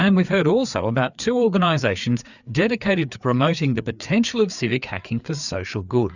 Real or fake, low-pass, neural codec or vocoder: fake; 7.2 kHz; codec, 16 kHz, 4 kbps, FreqCodec, larger model